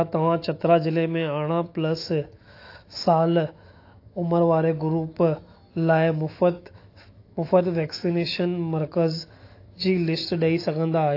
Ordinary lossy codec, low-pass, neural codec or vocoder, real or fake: AAC, 32 kbps; 5.4 kHz; autoencoder, 48 kHz, 128 numbers a frame, DAC-VAE, trained on Japanese speech; fake